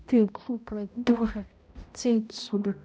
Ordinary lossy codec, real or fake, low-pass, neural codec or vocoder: none; fake; none; codec, 16 kHz, 0.5 kbps, X-Codec, HuBERT features, trained on balanced general audio